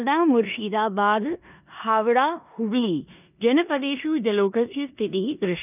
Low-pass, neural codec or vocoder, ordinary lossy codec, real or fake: 3.6 kHz; codec, 16 kHz in and 24 kHz out, 0.9 kbps, LongCat-Audio-Codec, four codebook decoder; none; fake